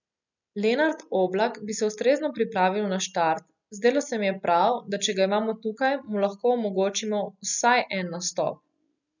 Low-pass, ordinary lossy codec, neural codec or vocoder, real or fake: 7.2 kHz; none; none; real